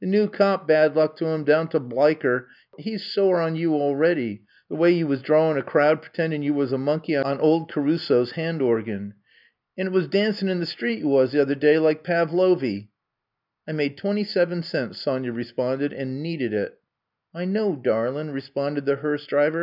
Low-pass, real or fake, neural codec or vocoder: 5.4 kHz; real; none